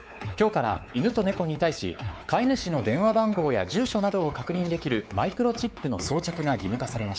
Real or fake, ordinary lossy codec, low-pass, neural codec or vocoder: fake; none; none; codec, 16 kHz, 4 kbps, X-Codec, WavLM features, trained on Multilingual LibriSpeech